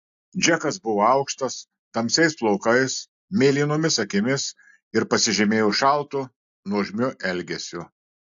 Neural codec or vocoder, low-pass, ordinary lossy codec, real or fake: none; 7.2 kHz; AAC, 64 kbps; real